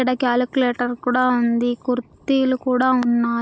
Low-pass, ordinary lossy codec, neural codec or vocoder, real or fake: none; none; none; real